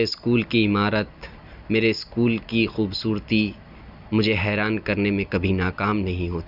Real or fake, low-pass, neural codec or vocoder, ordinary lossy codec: real; 5.4 kHz; none; none